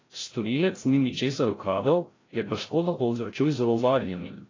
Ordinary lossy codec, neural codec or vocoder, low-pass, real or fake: AAC, 32 kbps; codec, 16 kHz, 0.5 kbps, FreqCodec, larger model; 7.2 kHz; fake